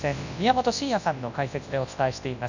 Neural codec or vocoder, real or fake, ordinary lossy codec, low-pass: codec, 24 kHz, 0.9 kbps, WavTokenizer, large speech release; fake; none; 7.2 kHz